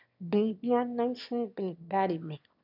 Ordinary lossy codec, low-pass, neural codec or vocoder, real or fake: none; 5.4 kHz; autoencoder, 22.05 kHz, a latent of 192 numbers a frame, VITS, trained on one speaker; fake